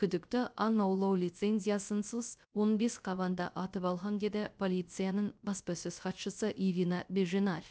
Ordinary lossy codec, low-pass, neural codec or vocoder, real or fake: none; none; codec, 16 kHz, 0.3 kbps, FocalCodec; fake